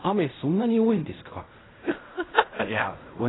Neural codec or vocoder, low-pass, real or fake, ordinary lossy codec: codec, 16 kHz in and 24 kHz out, 0.4 kbps, LongCat-Audio-Codec, fine tuned four codebook decoder; 7.2 kHz; fake; AAC, 16 kbps